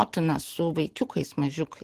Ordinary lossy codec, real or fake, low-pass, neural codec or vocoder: Opus, 16 kbps; real; 14.4 kHz; none